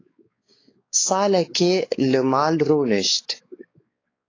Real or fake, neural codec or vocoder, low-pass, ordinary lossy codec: fake; codec, 16 kHz, 4 kbps, X-Codec, WavLM features, trained on Multilingual LibriSpeech; 7.2 kHz; AAC, 32 kbps